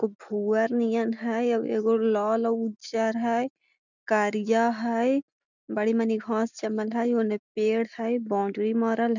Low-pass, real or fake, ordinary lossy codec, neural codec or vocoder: 7.2 kHz; real; none; none